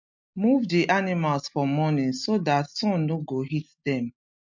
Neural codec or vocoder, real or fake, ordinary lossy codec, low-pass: none; real; MP3, 48 kbps; 7.2 kHz